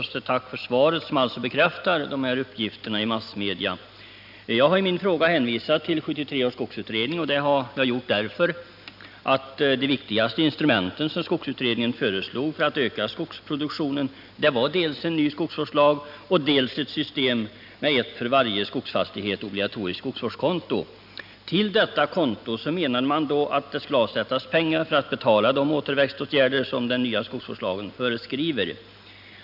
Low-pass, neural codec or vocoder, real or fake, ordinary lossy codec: 5.4 kHz; none; real; none